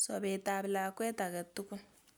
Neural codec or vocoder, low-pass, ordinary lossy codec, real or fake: none; none; none; real